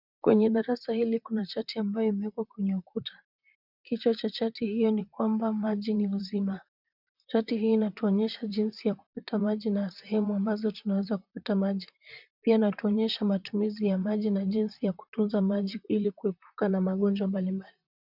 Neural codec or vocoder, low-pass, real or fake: vocoder, 44.1 kHz, 128 mel bands, Pupu-Vocoder; 5.4 kHz; fake